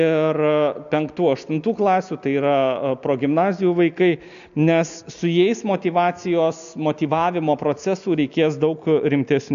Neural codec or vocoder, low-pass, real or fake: none; 7.2 kHz; real